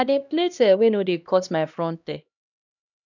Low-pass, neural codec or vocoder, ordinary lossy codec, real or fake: 7.2 kHz; codec, 16 kHz, 1 kbps, X-Codec, HuBERT features, trained on LibriSpeech; none; fake